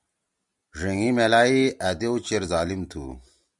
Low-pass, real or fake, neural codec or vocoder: 10.8 kHz; real; none